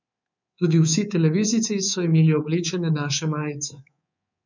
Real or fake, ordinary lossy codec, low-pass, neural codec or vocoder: fake; none; 7.2 kHz; codec, 24 kHz, 3.1 kbps, DualCodec